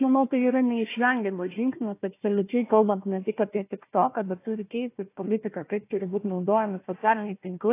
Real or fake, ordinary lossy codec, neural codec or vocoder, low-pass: fake; AAC, 24 kbps; codec, 16 kHz, 1 kbps, FunCodec, trained on Chinese and English, 50 frames a second; 3.6 kHz